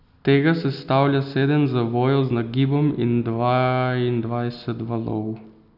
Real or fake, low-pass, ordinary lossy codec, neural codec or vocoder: real; 5.4 kHz; none; none